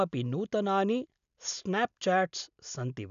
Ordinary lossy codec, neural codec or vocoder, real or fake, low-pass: none; none; real; 7.2 kHz